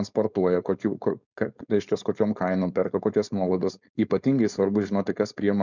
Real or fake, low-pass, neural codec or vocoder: fake; 7.2 kHz; codec, 16 kHz, 4.8 kbps, FACodec